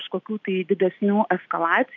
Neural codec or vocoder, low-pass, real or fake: none; 7.2 kHz; real